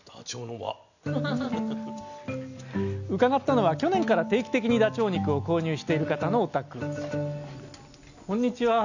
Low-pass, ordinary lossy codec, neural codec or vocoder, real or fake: 7.2 kHz; none; none; real